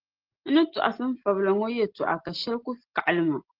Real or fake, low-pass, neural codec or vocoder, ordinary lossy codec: real; 5.4 kHz; none; Opus, 16 kbps